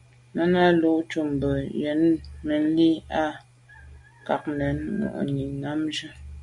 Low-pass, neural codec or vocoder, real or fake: 10.8 kHz; none; real